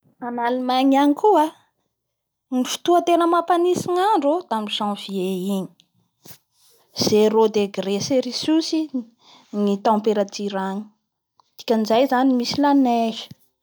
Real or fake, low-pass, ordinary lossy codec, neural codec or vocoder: real; none; none; none